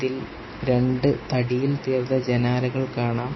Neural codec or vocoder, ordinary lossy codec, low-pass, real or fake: none; MP3, 24 kbps; 7.2 kHz; real